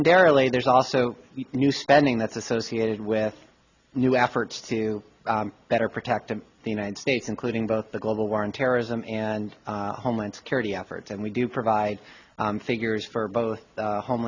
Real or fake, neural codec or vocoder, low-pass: real; none; 7.2 kHz